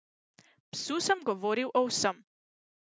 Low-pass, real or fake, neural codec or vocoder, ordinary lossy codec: none; real; none; none